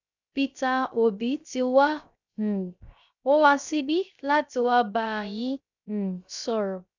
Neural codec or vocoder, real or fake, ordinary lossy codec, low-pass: codec, 16 kHz, 0.3 kbps, FocalCodec; fake; none; 7.2 kHz